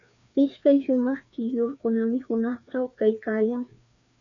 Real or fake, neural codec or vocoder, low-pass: fake; codec, 16 kHz, 2 kbps, FreqCodec, larger model; 7.2 kHz